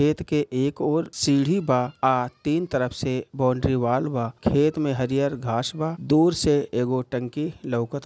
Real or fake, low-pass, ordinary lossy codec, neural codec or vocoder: real; none; none; none